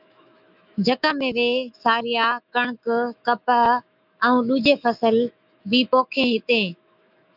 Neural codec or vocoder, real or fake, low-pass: autoencoder, 48 kHz, 128 numbers a frame, DAC-VAE, trained on Japanese speech; fake; 5.4 kHz